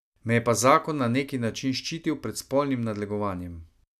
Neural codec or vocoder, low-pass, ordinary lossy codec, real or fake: none; 14.4 kHz; none; real